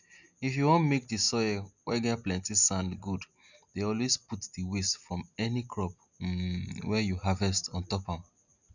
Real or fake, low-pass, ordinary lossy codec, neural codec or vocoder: real; 7.2 kHz; none; none